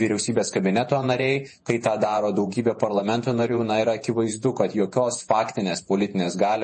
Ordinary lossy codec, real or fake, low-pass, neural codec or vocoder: MP3, 32 kbps; fake; 10.8 kHz; vocoder, 48 kHz, 128 mel bands, Vocos